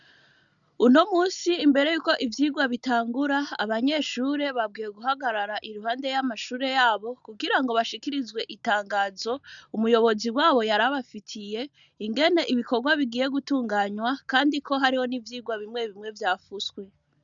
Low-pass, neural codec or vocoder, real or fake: 7.2 kHz; none; real